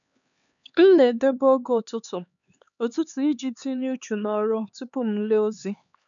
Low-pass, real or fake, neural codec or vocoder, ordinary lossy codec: 7.2 kHz; fake; codec, 16 kHz, 4 kbps, X-Codec, HuBERT features, trained on LibriSpeech; none